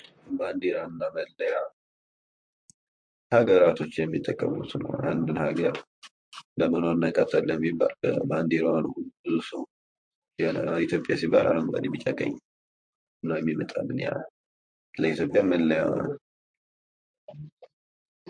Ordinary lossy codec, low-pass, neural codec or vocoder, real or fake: MP3, 48 kbps; 9.9 kHz; vocoder, 44.1 kHz, 128 mel bands, Pupu-Vocoder; fake